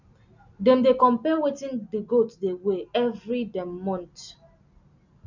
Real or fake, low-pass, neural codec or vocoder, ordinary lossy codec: real; 7.2 kHz; none; none